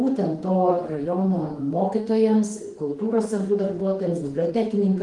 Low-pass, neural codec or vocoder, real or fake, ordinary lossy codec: 10.8 kHz; autoencoder, 48 kHz, 32 numbers a frame, DAC-VAE, trained on Japanese speech; fake; Opus, 24 kbps